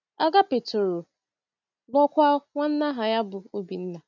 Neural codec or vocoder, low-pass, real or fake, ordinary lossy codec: none; 7.2 kHz; real; none